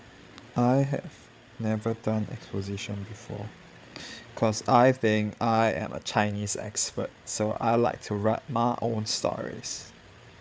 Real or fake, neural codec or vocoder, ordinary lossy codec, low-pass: fake; codec, 16 kHz, 8 kbps, FreqCodec, larger model; none; none